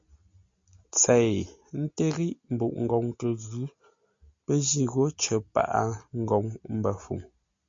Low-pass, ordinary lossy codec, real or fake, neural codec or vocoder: 7.2 kHz; MP3, 48 kbps; real; none